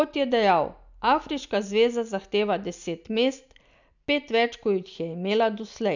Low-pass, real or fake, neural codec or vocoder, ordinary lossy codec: 7.2 kHz; real; none; none